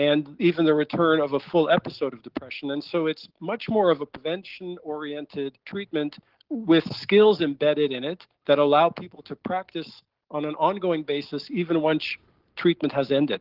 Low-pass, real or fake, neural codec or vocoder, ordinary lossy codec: 5.4 kHz; real; none; Opus, 16 kbps